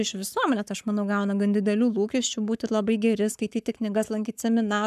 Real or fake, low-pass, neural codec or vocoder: fake; 14.4 kHz; codec, 44.1 kHz, 7.8 kbps, Pupu-Codec